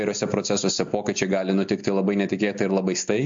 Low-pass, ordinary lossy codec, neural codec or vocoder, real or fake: 7.2 kHz; MP3, 48 kbps; none; real